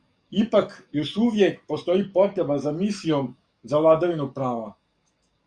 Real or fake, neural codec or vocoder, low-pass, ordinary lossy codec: fake; codec, 44.1 kHz, 7.8 kbps, DAC; 9.9 kHz; Opus, 64 kbps